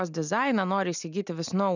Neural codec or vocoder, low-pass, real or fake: none; 7.2 kHz; real